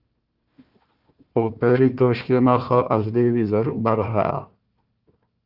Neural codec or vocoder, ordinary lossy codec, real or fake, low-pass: codec, 16 kHz, 1 kbps, FunCodec, trained on Chinese and English, 50 frames a second; Opus, 32 kbps; fake; 5.4 kHz